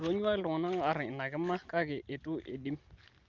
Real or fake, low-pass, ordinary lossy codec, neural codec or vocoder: real; 7.2 kHz; Opus, 32 kbps; none